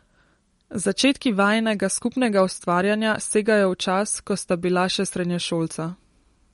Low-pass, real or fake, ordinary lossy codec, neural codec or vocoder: 19.8 kHz; real; MP3, 48 kbps; none